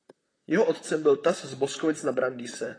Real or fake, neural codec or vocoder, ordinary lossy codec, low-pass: fake; vocoder, 44.1 kHz, 128 mel bands, Pupu-Vocoder; AAC, 32 kbps; 9.9 kHz